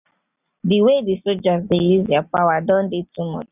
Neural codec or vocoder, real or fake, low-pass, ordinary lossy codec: none; real; 3.6 kHz; none